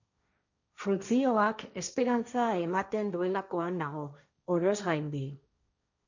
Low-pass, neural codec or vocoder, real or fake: 7.2 kHz; codec, 16 kHz, 1.1 kbps, Voila-Tokenizer; fake